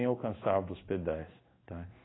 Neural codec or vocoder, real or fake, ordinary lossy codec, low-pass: codec, 16 kHz in and 24 kHz out, 1 kbps, XY-Tokenizer; fake; AAC, 16 kbps; 7.2 kHz